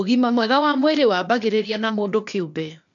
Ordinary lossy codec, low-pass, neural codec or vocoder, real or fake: none; 7.2 kHz; codec, 16 kHz, 0.8 kbps, ZipCodec; fake